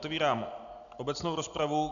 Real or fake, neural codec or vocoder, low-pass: real; none; 7.2 kHz